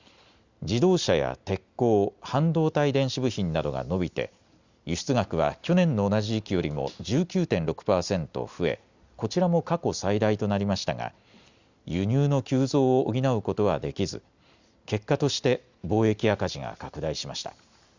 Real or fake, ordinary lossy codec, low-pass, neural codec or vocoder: real; Opus, 64 kbps; 7.2 kHz; none